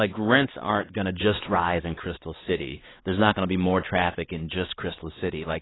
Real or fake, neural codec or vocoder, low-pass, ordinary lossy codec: fake; codec, 16 kHz, 2 kbps, X-Codec, WavLM features, trained on Multilingual LibriSpeech; 7.2 kHz; AAC, 16 kbps